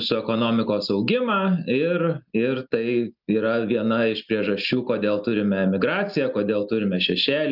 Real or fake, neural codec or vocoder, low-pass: real; none; 5.4 kHz